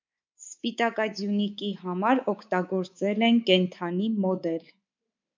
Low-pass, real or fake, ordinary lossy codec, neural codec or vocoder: 7.2 kHz; fake; MP3, 64 kbps; codec, 24 kHz, 3.1 kbps, DualCodec